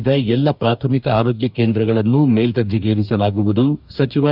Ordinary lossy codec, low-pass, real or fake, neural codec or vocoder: none; 5.4 kHz; fake; codec, 44.1 kHz, 2.6 kbps, DAC